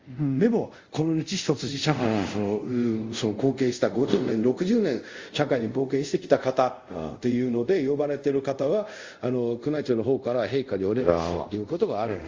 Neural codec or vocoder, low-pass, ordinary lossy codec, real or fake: codec, 24 kHz, 0.5 kbps, DualCodec; 7.2 kHz; Opus, 32 kbps; fake